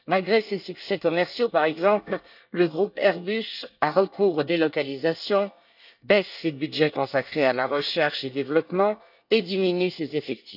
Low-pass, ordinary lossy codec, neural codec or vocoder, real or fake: 5.4 kHz; none; codec, 24 kHz, 1 kbps, SNAC; fake